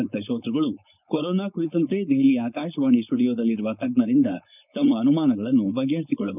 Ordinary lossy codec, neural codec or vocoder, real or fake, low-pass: none; codec, 16 kHz, 4.8 kbps, FACodec; fake; 3.6 kHz